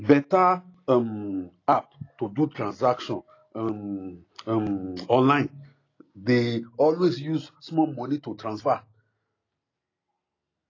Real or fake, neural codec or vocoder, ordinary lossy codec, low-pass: real; none; AAC, 32 kbps; 7.2 kHz